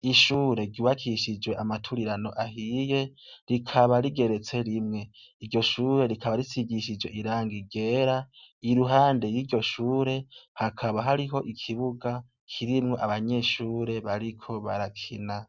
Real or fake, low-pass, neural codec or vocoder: real; 7.2 kHz; none